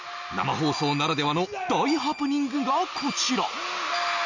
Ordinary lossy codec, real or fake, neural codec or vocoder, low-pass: none; real; none; 7.2 kHz